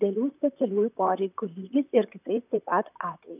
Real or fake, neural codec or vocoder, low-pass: fake; vocoder, 44.1 kHz, 128 mel bands, Pupu-Vocoder; 3.6 kHz